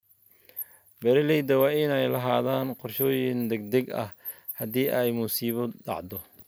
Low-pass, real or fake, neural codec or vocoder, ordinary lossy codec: none; real; none; none